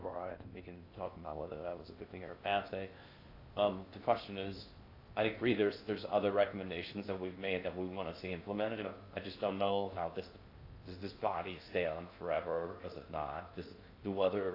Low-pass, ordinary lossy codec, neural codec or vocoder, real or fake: 5.4 kHz; AAC, 32 kbps; codec, 16 kHz in and 24 kHz out, 0.6 kbps, FocalCodec, streaming, 2048 codes; fake